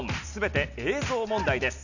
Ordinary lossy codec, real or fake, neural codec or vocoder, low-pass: none; real; none; 7.2 kHz